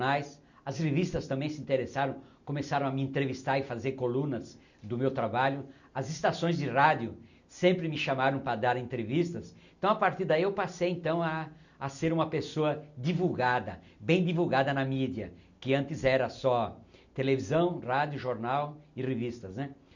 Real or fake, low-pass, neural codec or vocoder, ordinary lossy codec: real; 7.2 kHz; none; none